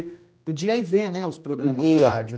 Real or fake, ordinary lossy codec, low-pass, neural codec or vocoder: fake; none; none; codec, 16 kHz, 1 kbps, X-Codec, HuBERT features, trained on general audio